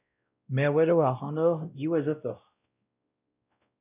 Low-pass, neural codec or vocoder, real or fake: 3.6 kHz; codec, 16 kHz, 0.5 kbps, X-Codec, WavLM features, trained on Multilingual LibriSpeech; fake